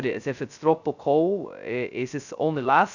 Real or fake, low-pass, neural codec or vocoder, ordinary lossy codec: fake; 7.2 kHz; codec, 16 kHz, 0.2 kbps, FocalCodec; none